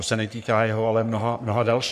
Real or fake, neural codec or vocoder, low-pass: fake; codec, 44.1 kHz, 3.4 kbps, Pupu-Codec; 14.4 kHz